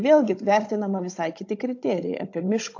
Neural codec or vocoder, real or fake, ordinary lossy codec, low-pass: codec, 16 kHz, 16 kbps, FunCodec, trained on Chinese and English, 50 frames a second; fake; AAC, 48 kbps; 7.2 kHz